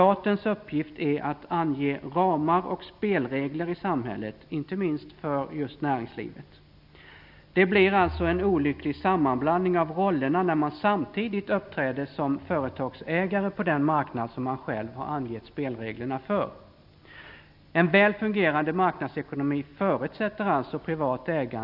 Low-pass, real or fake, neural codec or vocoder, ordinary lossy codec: 5.4 kHz; real; none; none